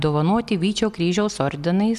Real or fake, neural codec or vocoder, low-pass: real; none; 14.4 kHz